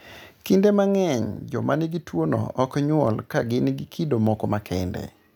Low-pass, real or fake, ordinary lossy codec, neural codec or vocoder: none; real; none; none